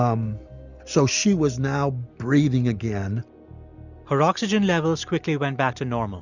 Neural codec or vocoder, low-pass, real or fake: none; 7.2 kHz; real